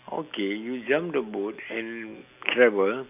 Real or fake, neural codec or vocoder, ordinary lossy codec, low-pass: real; none; none; 3.6 kHz